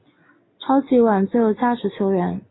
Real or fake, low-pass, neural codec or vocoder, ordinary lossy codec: real; 7.2 kHz; none; AAC, 16 kbps